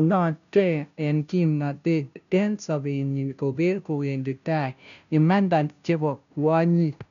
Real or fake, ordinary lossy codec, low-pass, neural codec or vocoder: fake; none; 7.2 kHz; codec, 16 kHz, 0.5 kbps, FunCodec, trained on Chinese and English, 25 frames a second